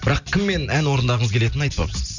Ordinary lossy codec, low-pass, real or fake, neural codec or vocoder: none; 7.2 kHz; real; none